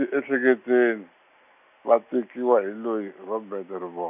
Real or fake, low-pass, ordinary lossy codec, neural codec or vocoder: real; 3.6 kHz; none; none